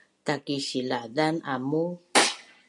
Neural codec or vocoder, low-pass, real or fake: none; 10.8 kHz; real